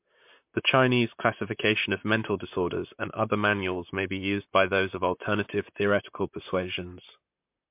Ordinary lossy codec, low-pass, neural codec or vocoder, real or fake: MP3, 32 kbps; 3.6 kHz; vocoder, 44.1 kHz, 128 mel bands, Pupu-Vocoder; fake